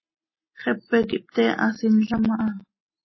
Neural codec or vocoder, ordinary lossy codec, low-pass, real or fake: none; MP3, 24 kbps; 7.2 kHz; real